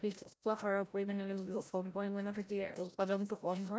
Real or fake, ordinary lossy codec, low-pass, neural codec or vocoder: fake; none; none; codec, 16 kHz, 0.5 kbps, FreqCodec, larger model